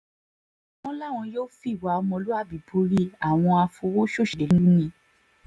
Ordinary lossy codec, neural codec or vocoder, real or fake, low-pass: none; none; real; none